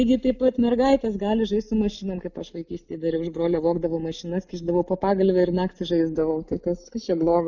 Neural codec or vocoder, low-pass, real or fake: none; 7.2 kHz; real